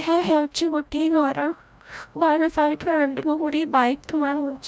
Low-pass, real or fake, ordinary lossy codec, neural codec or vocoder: none; fake; none; codec, 16 kHz, 0.5 kbps, FreqCodec, larger model